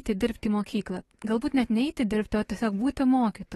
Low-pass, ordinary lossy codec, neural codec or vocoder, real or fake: 19.8 kHz; AAC, 32 kbps; codec, 44.1 kHz, 7.8 kbps, DAC; fake